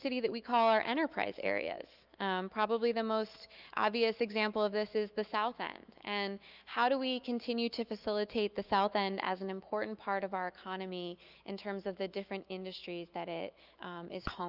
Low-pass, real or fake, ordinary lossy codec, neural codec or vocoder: 5.4 kHz; fake; Opus, 24 kbps; autoencoder, 48 kHz, 128 numbers a frame, DAC-VAE, trained on Japanese speech